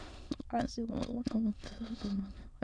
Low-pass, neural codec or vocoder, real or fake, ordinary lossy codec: 9.9 kHz; autoencoder, 22.05 kHz, a latent of 192 numbers a frame, VITS, trained on many speakers; fake; none